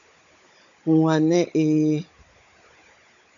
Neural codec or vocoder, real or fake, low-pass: codec, 16 kHz, 16 kbps, FunCodec, trained on Chinese and English, 50 frames a second; fake; 7.2 kHz